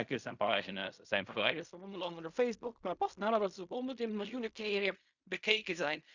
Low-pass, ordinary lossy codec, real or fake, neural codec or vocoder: 7.2 kHz; Opus, 64 kbps; fake; codec, 16 kHz in and 24 kHz out, 0.4 kbps, LongCat-Audio-Codec, fine tuned four codebook decoder